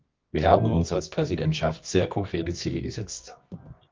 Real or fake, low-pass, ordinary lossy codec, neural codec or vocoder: fake; 7.2 kHz; Opus, 32 kbps; codec, 24 kHz, 0.9 kbps, WavTokenizer, medium music audio release